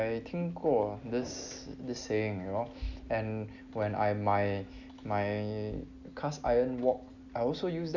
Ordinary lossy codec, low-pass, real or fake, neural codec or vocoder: none; 7.2 kHz; real; none